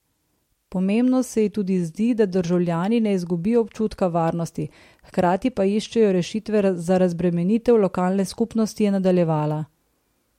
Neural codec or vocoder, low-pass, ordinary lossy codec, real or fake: none; 19.8 kHz; MP3, 64 kbps; real